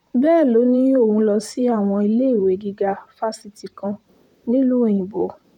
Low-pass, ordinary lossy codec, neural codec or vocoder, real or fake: 19.8 kHz; none; vocoder, 44.1 kHz, 128 mel bands, Pupu-Vocoder; fake